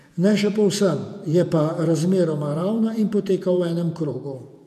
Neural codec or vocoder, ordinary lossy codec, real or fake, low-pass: vocoder, 48 kHz, 128 mel bands, Vocos; MP3, 96 kbps; fake; 14.4 kHz